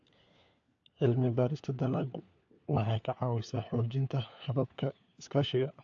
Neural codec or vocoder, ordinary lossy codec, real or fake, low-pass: codec, 16 kHz, 4 kbps, FunCodec, trained on LibriTTS, 50 frames a second; AAC, 48 kbps; fake; 7.2 kHz